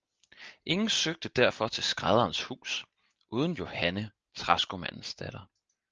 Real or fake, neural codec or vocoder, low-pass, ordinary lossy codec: real; none; 7.2 kHz; Opus, 24 kbps